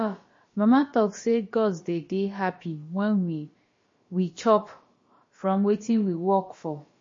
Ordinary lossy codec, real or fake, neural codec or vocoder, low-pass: MP3, 32 kbps; fake; codec, 16 kHz, about 1 kbps, DyCAST, with the encoder's durations; 7.2 kHz